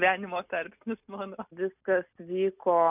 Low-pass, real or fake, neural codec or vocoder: 3.6 kHz; real; none